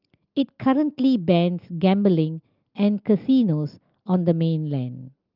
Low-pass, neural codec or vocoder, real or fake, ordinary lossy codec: 5.4 kHz; none; real; Opus, 24 kbps